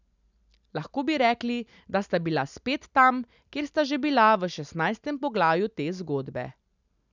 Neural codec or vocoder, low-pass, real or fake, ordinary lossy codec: none; 7.2 kHz; real; none